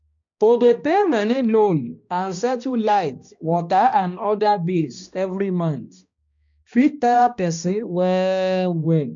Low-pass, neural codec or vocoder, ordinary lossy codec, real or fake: 7.2 kHz; codec, 16 kHz, 1 kbps, X-Codec, HuBERT features, trained on balanced general audio; MP3, 64 kbps; fake